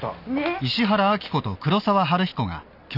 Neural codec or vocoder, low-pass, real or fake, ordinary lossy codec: none; 5.4 kHz; real; none